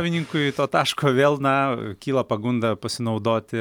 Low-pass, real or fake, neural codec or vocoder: 19.8 kHz; real; none